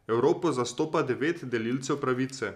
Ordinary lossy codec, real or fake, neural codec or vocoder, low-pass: none; real; none; 14.4 kHz